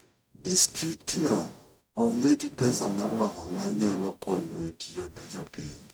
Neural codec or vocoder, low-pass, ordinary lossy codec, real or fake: codec, 44.1 kHz, 0.9 kbps, DAC; none; none; fake